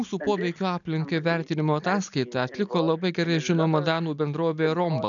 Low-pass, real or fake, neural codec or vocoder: 7.2 kHz; real; none